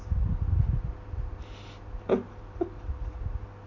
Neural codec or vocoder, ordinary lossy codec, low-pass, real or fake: none; none; 7.2 kHz; real